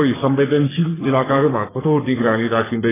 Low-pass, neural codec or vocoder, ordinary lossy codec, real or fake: 3.6 kHz; codec, 44.1 kHz, 3.4 kbps, Pupu-Codec; AAC, 16 kbps; fake